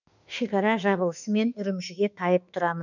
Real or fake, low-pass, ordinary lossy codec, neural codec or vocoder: fake; 7.2 kHz; none; autoencoder, 48 kHz, 32 numbers a frame, DAC-VAE, trained on Japanese speech